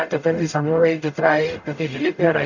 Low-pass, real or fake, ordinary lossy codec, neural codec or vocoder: 7.2 kHz; fake; none; codec, 44.1 kHz, 0.9 kbps, DAC